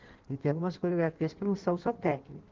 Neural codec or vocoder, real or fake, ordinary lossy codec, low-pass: codec, 16 kHz in and 24 kHz out, 1.1 kbps, FireRedTTS-2 codec; fake; Opus, 16 kbps; 7.2 kHz